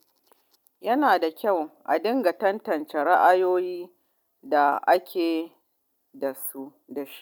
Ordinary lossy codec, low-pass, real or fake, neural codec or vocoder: none; 19.8 kHz; real; none